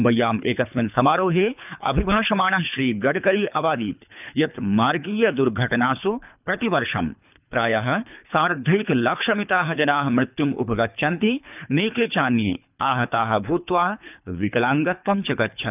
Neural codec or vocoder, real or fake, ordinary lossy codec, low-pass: codec, 24 kHz, 3 kbps, HILCodec; fake; none; 3.6 kHz